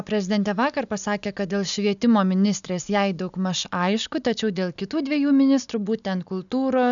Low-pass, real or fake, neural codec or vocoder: 7.2 kHz; real; none